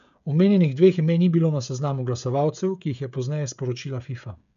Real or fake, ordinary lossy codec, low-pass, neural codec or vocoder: fake; none; 7.2 kHz; codec, 16 kHz, 16 kbps, FreqCodec, smaller model